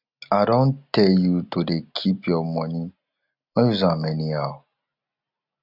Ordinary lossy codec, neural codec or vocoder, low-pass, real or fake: none; none; 5.4 kHz; real